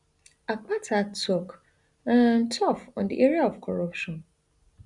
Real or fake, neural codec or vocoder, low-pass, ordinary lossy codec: real; none; 10.8 kHz; none